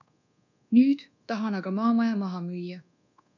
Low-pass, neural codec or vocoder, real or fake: 7.2 kHz; codec, 24 kHz, 1.2 kbps, DualCodec; fake